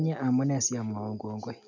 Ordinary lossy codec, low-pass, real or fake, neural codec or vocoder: none; 7.2 kHz; real; none